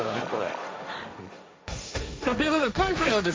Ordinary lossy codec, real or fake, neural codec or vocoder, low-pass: none; fake; codec, 16 kHz, 1.1 kbps, Voila-Tokenizer; none